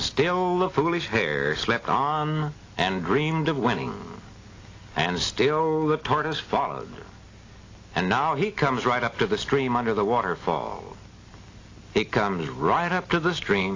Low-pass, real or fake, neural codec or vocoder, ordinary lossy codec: 7.2 kHz; real; none; AAC, 32 kbps